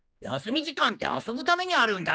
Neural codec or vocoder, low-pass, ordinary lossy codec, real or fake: codec, 16 kHz, 2 kbps, X-Codec, HuBERT features, trained on general audio; none; none; fake